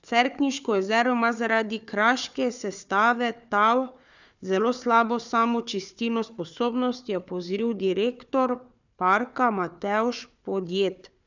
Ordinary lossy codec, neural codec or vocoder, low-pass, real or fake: none; codec, 16 kHz, 4 kbps, FunCodec, trained on Chinese and English, 50 frames a second; 7.2 kHz; fake